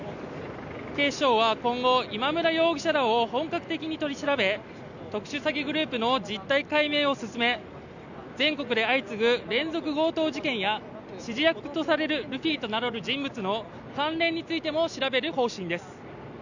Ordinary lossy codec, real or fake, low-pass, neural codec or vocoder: none; real; 7.2 kHz; none